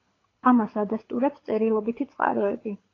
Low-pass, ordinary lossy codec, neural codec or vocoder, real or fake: 7.2 kHz; AAC, 32 kbps; codec, 24 kHz, 6 kbps, HILCodec; fake